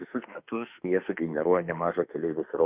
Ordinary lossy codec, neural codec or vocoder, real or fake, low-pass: Opus, 64 kbps; autoencoder, 48 kHz, 32 numbers a frame, DAC-VAE, trained on Japanese speech; fake; 3.6 kHz